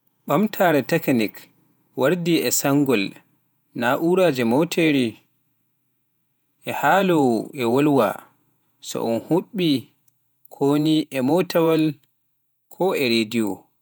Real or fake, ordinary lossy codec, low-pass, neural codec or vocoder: fake; none; none; vocoder, 48 kHz, 128 mel bands, Vocos